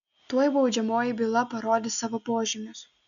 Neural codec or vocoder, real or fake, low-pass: none; real; 7.2 kHz